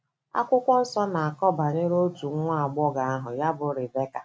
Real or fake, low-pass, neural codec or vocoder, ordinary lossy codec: real; none; none; none